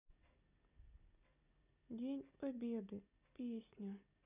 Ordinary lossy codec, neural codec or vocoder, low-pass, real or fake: none; none; 3.6 kHz; real